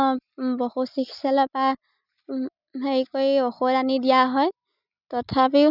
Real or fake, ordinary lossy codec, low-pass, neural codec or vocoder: real; none; 5.4 kHz; none